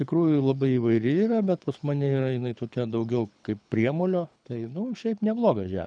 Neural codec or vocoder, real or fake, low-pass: codec, 24 kHz, 6 kbps, HILCodec; fake; 9.9 kHz